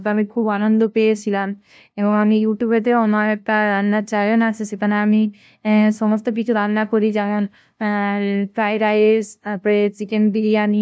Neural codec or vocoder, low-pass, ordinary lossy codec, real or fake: codec, 16 kHz, 0.5 kbps, FunCodec, trained on LibriTTS, 25 frames a second; none; none; fake